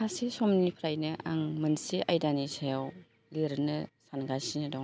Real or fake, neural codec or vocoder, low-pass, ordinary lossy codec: real; none; none; none